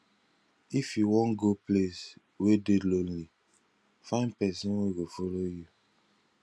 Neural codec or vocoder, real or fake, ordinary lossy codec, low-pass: none; real; none; none